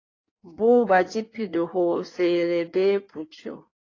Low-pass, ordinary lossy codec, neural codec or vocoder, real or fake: 7.2 kHz; AAC, 32 kbps; codec, 16 kHz in and 24 kHz out, 1.1 kbps, FireRedTTS-2 codec; fake